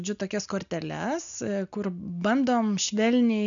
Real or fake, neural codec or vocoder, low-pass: real; none; 7.2 kHz